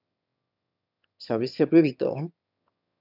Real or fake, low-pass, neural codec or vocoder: fake; 5.4 kHz; autoencoder, 22.05 kHz, a latent of 192 numbers a frame, VITS, trained on one speaker